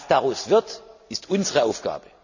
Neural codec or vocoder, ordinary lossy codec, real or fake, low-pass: none; none; real; 7.2 kHz